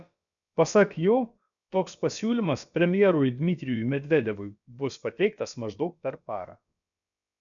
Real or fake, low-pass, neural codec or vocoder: fake; 7.2 kHz; codec, 16 kHz, about 1 kbps, DyCAST, with the encoder's durations